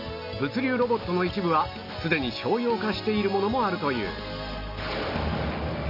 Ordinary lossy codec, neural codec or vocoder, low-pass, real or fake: none; none; 5.4 kHz; real